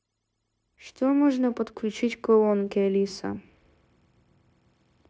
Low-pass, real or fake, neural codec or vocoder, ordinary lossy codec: none; fake; codec, 16 kHz, 0.9 kbps, LongCat-Audio-Codec; none